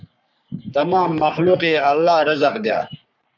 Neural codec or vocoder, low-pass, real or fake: codec, 44.1 kHz, 3.4 kbps, Pupu-Codec; 7.2 kHz; fake